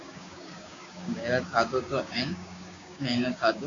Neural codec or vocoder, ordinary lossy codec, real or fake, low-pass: codec, 16 kHz, 6 kbps, DAC; AAC, 48 kbps; fake; 7.2 kHz